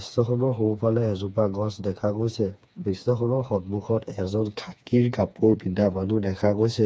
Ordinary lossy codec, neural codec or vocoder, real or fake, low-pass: none; codec, 16 kHz, 4 kbps, FreqCodec, smaller model; fake; none